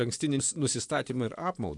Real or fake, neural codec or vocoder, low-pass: fake; vocoder, 24 kHz, 100 mel bands, Vocos; 10.8 kHz